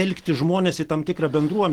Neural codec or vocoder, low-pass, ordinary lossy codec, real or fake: none; 19.8 kHz; Opus, 16 kbps; real